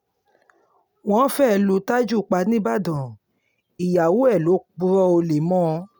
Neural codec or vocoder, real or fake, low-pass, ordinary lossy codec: none; real; none; none